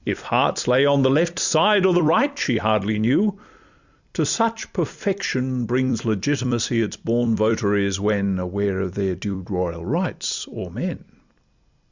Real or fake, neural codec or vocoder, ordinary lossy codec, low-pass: fake; vocoder, 44.1 kHz, 128 mel bands every 256 samples, BigVGAN v2; Opus, 64 kbps; 7.2 kHz